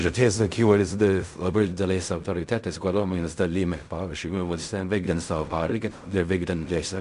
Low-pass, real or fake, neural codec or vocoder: 10.8 kHz; fake; codec, 16 kHz in and 24 kHz out, 0.4 kbps, LongCat-Audio-Codec, fine tuned four codebook decoder